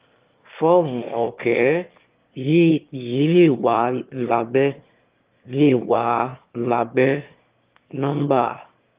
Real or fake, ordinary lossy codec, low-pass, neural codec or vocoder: fake; Opus, 32 kbps; 3.6 kHz; autoencoder, 22.05 kHz, a latent of 192 numbers a frame, VITS, trained on one speaker